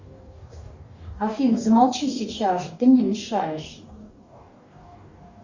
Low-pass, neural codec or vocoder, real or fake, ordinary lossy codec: 7.2 kHz; codec, 44.1 kHz, 2.6 kbps, DAC; fake; Opus, 64 kbps